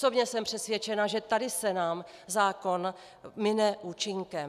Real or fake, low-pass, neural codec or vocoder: real; 14.4 kHz; none